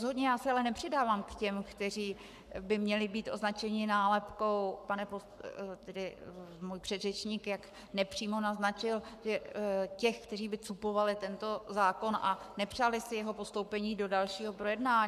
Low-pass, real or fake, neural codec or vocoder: 14.4 kHz; fake; codec, 44.1 kHz, 7.8 kbps, Pupu-Codec